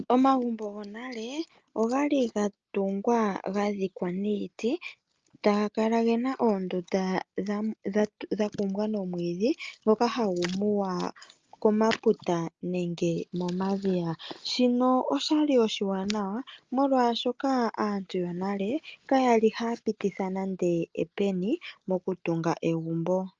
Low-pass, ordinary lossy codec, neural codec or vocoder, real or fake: 7.2 kHz; Opus, 24 kbps; none; real